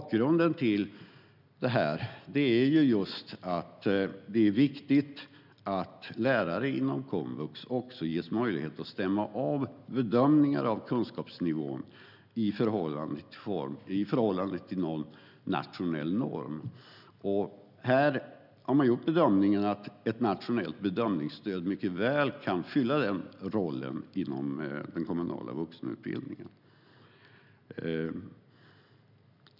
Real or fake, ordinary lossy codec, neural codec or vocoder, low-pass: real; none; none; 5.4 kHz